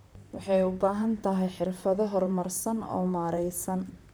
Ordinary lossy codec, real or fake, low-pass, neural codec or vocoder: none; fake; none; vocoder, 44.1 kHz, 128 mel bands, Pupu-Vocoder